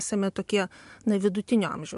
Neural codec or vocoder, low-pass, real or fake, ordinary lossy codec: none; 10.8 kHz; real; MP3, 64 kbps